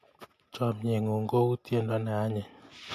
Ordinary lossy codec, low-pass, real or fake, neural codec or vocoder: AAC, 64 kbps; 14.4 kHz; real; none